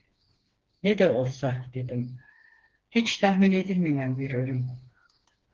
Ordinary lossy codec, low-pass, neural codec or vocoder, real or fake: Opus, 16 kbps; 7.2 kHz; codec, 16 kHz, 2 kbps, FreqCodec, smaller model; fake